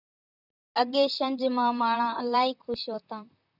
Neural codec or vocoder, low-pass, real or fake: vocoder, 44.1 kHz, 128 mel bands, Pupu-Vocoder; 5.4 kHz; fake